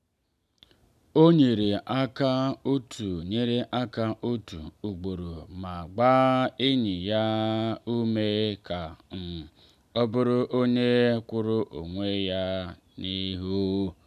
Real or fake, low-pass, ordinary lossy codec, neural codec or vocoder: real; 14.4 kHz; none; none